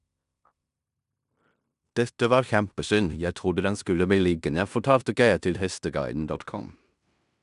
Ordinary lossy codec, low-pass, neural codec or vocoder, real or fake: none; 10.8 kHz; codec, 16 kHz in and 24 kHz out, 0.9 kbps, LongCat-Audio-Codec, fine tuned four codebook decoder; fake